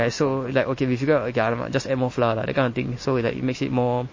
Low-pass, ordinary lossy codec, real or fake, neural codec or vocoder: 7.2 kHz; MP3, 32 kbps; real; none